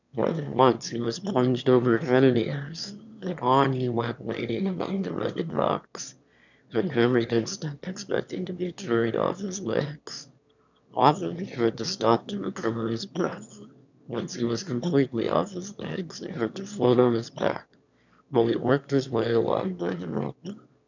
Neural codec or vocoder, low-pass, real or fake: autoencoder, 22.05 kHz, a latent of 192 numbers a frame, VITS, trained on one speaker; 7.2 kHz; fake